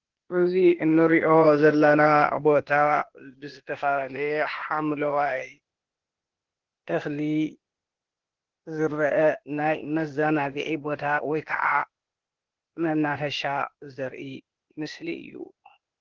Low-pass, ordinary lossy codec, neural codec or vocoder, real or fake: 7.2 kHz; Opus, 16 kbps; codec, 16 kHz, 0.8 kbps, ZipCodec; fake